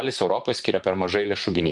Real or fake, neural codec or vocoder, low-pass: fake; vocoder, 44.1 kHz, 128 mel bands, Pupu-Vocoder; 10.8 kHz